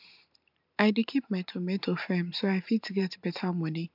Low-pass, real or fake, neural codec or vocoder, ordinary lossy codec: 5.4 kHz; fake; vocoder, 44.1 kHz, 128 mel bands every 512 samples, BigVGAN v2; MP3, 48 kbps